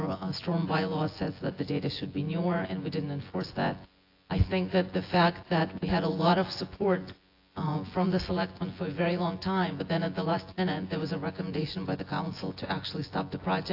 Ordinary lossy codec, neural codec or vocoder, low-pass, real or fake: AAC, 32 kbps; vocoder, 24 kHz, 100 mel bands, Vocos; 5.4 kHz; fake